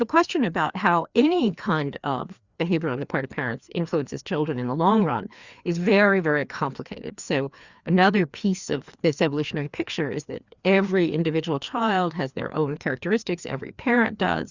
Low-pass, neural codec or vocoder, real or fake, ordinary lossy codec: 7.2 kHz; codec, 16 kHz, 2 kbps, FreqCodec, larger model; fake; Opus, 64 kbps